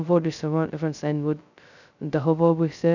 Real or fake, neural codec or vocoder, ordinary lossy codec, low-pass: fake; codec, 16 kHz, 0.2 kbps, FocalCodec; none; 7.2 kHz